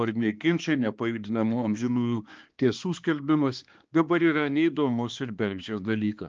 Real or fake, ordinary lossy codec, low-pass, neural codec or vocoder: fake; Opus, 24 kbps; 7.2 kHz; codec, 16 kHz, 2 kbps, X-Codec, HuBERT features, trained on balanced general audio